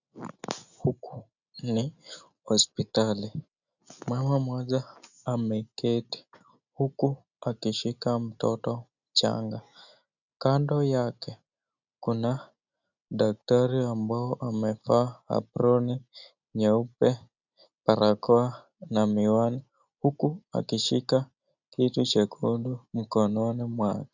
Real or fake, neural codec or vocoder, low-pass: real; none; 7.2 kHz